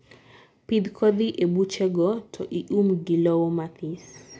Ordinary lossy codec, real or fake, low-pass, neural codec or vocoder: none; real; none; none